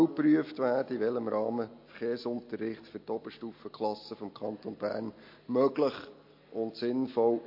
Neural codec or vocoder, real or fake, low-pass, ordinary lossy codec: none; real; 5.4 kHz; MP3, 32 kbps